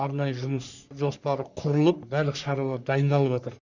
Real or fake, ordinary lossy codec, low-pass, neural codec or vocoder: fake; none; 7.2 kHz; codec, 44.1 kHz, 3.4 kbps, Pupu-Codec